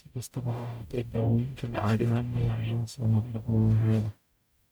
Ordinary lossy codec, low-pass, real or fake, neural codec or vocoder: none; none; fake; codec, 44.1 kHz, 0.9 kbps, DAC